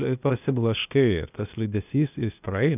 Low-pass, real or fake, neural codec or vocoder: 3.6 kHz; fake; codec, 16 kHz, 0.8 kbps, ZipCodec